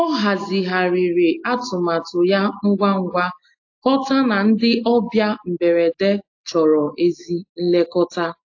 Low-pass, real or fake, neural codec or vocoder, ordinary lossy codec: 7.2 kHz; real; none; AAC, 48 kbps